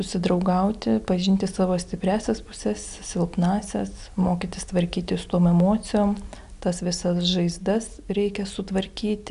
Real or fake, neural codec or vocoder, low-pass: real; none; 10.8 kHz